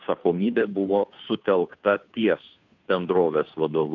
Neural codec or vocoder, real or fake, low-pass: codec, 16 kHz, 2 kbps, FunCodec, trained on Chinese and English, 25 frames a second; fake; 7.2 kHz